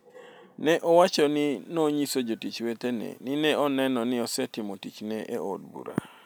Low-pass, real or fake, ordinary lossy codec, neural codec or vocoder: none; real; none; none